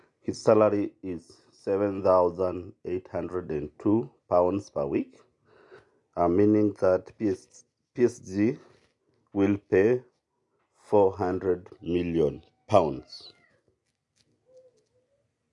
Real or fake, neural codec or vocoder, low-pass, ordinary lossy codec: real; none; 10.8 kHz; MP3, 64 kbps